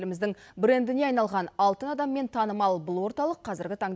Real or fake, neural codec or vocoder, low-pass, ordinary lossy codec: real; none; none; none